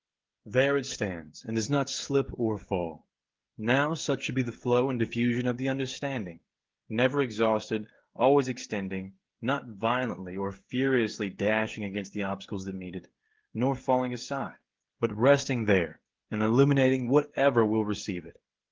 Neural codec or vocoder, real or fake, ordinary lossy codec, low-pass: codec, 16 kHz, 16 kbps, FreqCodec, smaller model; fake; Opus, 16 kbps; 7.2 kHz